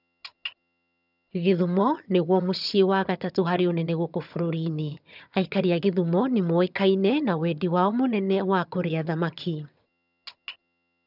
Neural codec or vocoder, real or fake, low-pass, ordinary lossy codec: vocoder, 22.05 kHz, 80 mel bands, HiFi-GAN; fake; 5.4 kHz; none